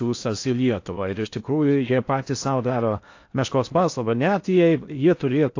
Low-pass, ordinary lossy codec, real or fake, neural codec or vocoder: 7.2 kHz; AAC, 48 kbps; fake; codec, 16 kHz in and 24 kHz out, 0.6 kbps, FocalCodec, streaming, 4096 codes